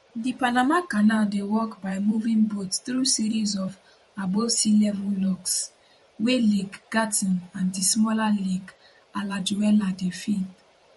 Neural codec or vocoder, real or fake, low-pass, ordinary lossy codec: vocoder, 44.1 kHz, 128 mel bands every 512 samples, BigVGAN v2; fake; 19.8 kHz; MP3, 48 kbps